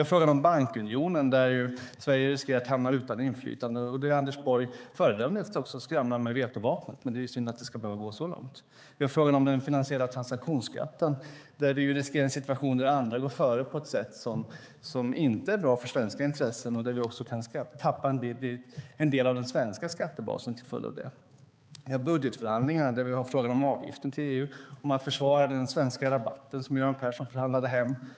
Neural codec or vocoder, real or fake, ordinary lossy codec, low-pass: codec, 16 kHz, 4 kbps, X-Codec, HuBERT features, trained on balanced general audio; fake; none; none